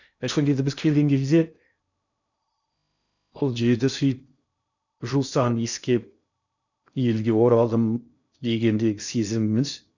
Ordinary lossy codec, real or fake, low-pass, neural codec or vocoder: none; fake; 7.2 kHz; codec, 16 kHz in and 24 kHz out, 0.6 kbps, FocalCodec, streaming, 2048 codes